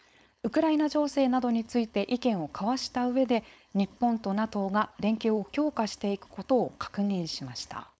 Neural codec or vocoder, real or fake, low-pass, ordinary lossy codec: codec, 16 kHz, 4.8 kbps, FACodec; fake; none; none